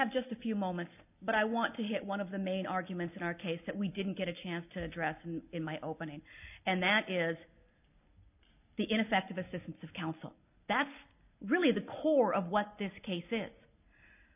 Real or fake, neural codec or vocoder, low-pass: real; none; 3.6 kHz